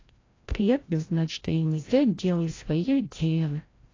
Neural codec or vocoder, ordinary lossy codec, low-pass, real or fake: codec, 16 kHz, 0.5 kbps, FreqCodec, larger model; AAC, 32 kbps; 7.2 kHz; fake